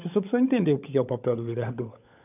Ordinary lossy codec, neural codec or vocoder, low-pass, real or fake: none; codec, 16 kHz, 16 kbps, FunCodec, trained on LibriTTS, 50 frames a second; 3.6 kHz; fake